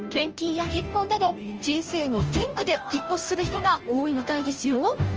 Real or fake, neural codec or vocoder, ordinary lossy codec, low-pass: fake; codec, 16 kHz, 0.5 kbps, FunCodec, trained on Chinese and English, 25 frames a second; Opus, 24 kbps; 7.2 kHz